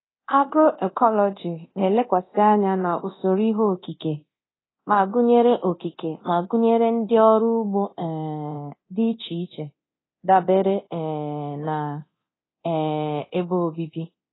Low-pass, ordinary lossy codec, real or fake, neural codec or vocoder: 7.2 kHz; AAC, 16 kbps; fake; codec, 24 kHz, 0.9 kbps, DualCodec